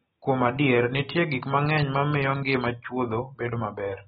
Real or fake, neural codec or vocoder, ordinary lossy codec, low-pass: real; none; AAC, 16 kbps; 19.8 kHz